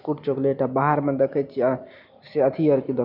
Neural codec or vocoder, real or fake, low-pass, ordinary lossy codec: none; real; 5.4 kHz; none